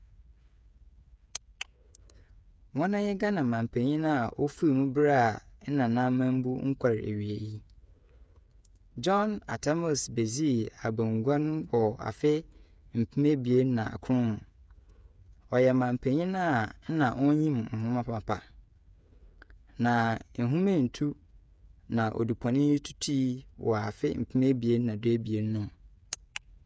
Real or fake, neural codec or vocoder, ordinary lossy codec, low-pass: fake; codec, 16 kHz, 8 kbps, FreqCodec, smaller model; none; none